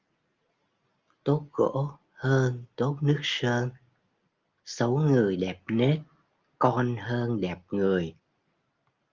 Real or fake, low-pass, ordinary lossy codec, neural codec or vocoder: real; 7.2 kHz; Opus, 32 kbps; none